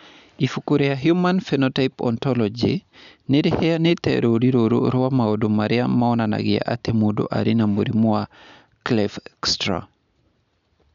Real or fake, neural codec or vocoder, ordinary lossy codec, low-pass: real; none; none; 7.2 kHz